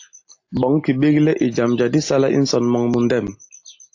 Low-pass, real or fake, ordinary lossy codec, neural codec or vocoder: 7.2 kHz; real; AAC, 48 kbps; none